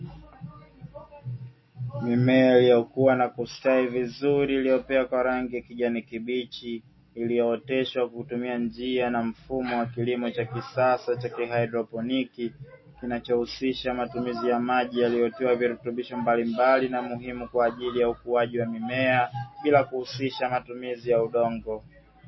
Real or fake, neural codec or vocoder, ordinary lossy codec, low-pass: real; none; MP3, 24 kbps; 7.2 kHz